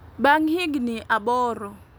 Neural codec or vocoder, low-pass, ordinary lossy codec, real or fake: none; none; none; real